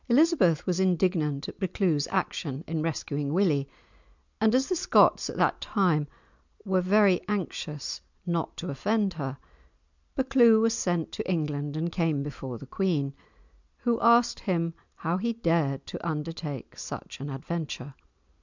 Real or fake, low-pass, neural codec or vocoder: real; 7.2 kHz; none